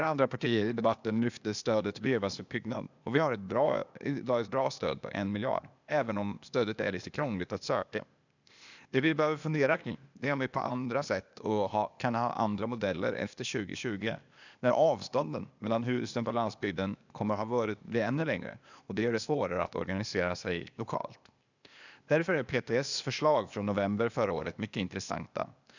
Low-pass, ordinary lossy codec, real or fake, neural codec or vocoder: 7.2 kHz; none; fake; codec, 16 kHz, 0.8 kbps, ZipCodec